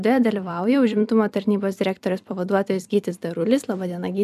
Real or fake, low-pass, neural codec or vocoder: fake; 14.4 kHz; vocoder, 44.1 kHz, 128 mel bands every 256 samples, BigVGAN v2